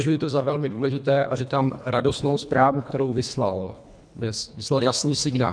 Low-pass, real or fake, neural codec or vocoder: 9.9 kHz; fake; codec, 24 kHz, 1.5 kbps, HILCodec